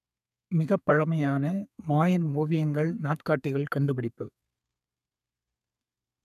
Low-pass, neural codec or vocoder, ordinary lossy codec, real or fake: 14.4 kHz; codec, 32 kHz, 1.9 kbps, SNAC; none; fake